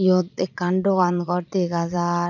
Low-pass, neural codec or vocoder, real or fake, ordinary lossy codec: 7.2 kHz; vocoder, 44.1 kHz, 80 mel bands, Vocos; fake; none